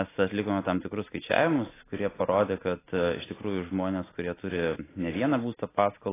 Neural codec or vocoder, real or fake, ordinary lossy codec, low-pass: none; real; AAC, 16 kbps; 3.6 kHz